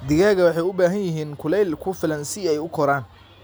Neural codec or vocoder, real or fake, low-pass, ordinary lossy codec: none; real; none; none